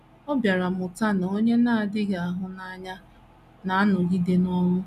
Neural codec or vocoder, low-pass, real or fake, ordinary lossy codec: none; 14.4 kHz; real; none